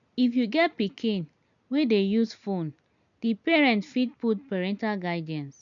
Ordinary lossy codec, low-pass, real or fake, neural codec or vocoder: none; 7.2 kHz; real; none